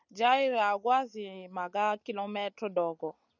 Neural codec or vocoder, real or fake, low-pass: none; real; 7.2 kHz